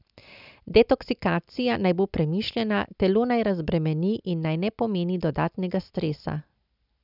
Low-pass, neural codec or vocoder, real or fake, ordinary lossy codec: 5.4 kHz; none; real; none